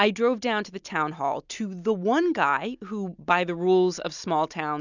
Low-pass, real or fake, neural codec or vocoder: 7.2 kHz; real; none